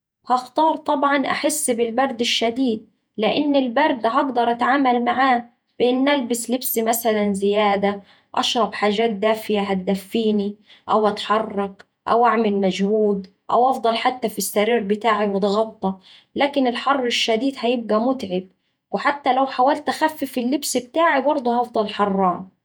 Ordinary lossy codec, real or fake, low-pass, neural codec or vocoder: none; fake; none; vocoder, 48 kHz, 128 mel bands, Vocos